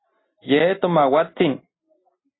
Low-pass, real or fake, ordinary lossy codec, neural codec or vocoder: 7.2 kHz; real; AAC, 16 kbps; none